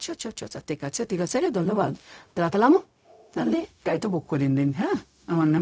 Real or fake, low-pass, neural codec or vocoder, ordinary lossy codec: fake; none; codec, 16 kHz, 0.4 kbps, LongCat-Audio-Codec; none